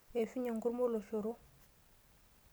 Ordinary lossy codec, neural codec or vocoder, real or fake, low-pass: none; none; real; none